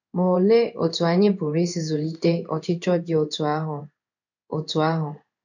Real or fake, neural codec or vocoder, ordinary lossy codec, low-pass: fake; codec, 16 kHz in and 24 kHz out, 1 kbps, XY-Tokenizer; none; 7.2 kHz